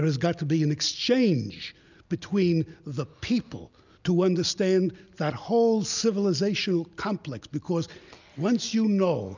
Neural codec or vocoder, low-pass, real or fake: none; 7.2 kHz; real